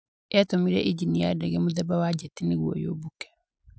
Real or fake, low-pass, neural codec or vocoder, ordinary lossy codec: real; none; none; none